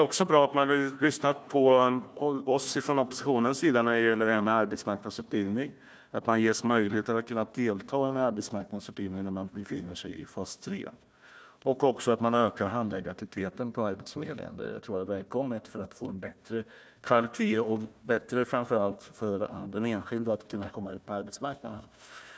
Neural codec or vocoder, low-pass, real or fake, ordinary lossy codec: codec, 16 kHz, 1 kbps, FunCodec, trained on Chinese and English, 50 frames a second; none; fake; none